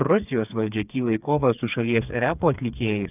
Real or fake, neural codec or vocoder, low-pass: fake; codec, 16 kHz, 4 kbps, FreqCodec, smaller model; 3.6 kHz